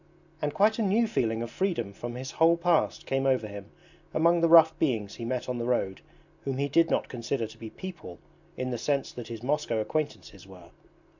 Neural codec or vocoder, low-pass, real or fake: none; 7.2 kHz; real